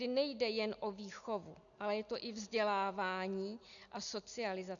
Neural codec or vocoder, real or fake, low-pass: none; real; 7.2 kHz